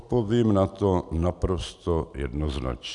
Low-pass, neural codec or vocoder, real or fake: 10.8 kHz; none; real